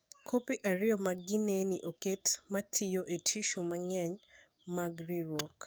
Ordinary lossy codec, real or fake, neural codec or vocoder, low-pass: none; fake; codec, 44.1 kHz, 7.8 kbps, DAC; none